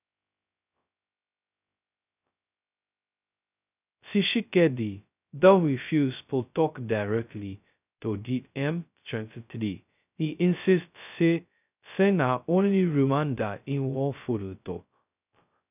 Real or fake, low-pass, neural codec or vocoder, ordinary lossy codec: fake; 3.6 kHz; codec, 16 kHz, 0.2 kbps, FocalCodec; none